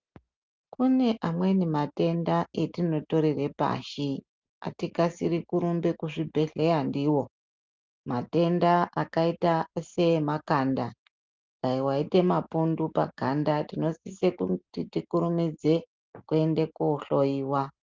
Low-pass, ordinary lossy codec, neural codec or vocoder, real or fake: 7.2 kHz; Opus, 24 kbps; none; real